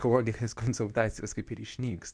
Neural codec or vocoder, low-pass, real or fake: codec, 24 kHz, 0.9 kbps, WavTokenizer, medium speech release version 2; 9.9 kHz; fake